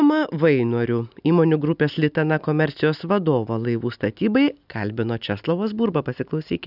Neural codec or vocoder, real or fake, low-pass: none; real; 5.4 kHz